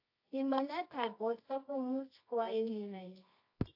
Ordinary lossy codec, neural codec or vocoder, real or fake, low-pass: AAC, 32 kbps; codec, 24 kHz, 0.9 kbps, WavTokenizer, medium music audio release; fake; 5.4 kHz